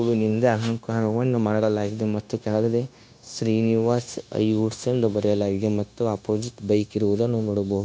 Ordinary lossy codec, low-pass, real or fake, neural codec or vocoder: none; none; fake; codec, 16 kHz, 0.9 kbps, LongCat-Audio-Codec